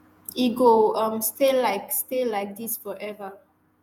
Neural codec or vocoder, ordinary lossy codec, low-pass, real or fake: vocoder, 48 kHz, 128 mel bands, Vocos; none; none; fake